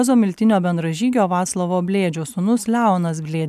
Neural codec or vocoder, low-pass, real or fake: none; 14.4 kHz; real